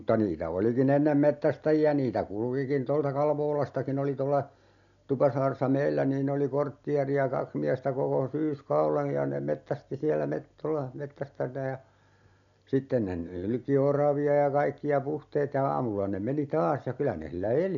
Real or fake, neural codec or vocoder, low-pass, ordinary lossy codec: real; none; 7.2 kHz; none